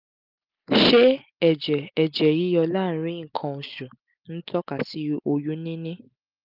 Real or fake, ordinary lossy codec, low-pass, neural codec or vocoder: real; Opus, 24 kbps; 5.4 kHz; none